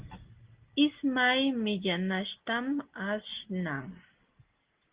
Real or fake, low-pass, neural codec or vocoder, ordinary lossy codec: real; 3.6 kHz; none; Opus, 16 kbps